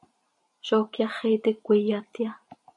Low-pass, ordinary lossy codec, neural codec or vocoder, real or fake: 10.8 kHz; MP3, 48 kbps; vocoder, 44.1 kHz, 128 mel bands every 256 samples, BigVGAN v2; fake